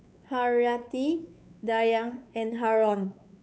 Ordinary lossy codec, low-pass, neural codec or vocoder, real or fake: none; none; codec, 16 kHz, 4 kbps, X-Codec, WavLM features, trained on Multilingual LibriSpeech; fake